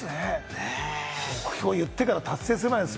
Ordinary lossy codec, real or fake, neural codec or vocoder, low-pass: none; real; none; none